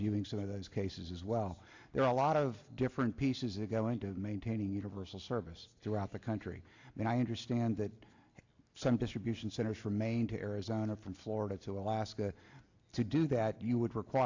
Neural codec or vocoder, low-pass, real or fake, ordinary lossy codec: none; 7.2 kHz; real; AAC, 48 kbps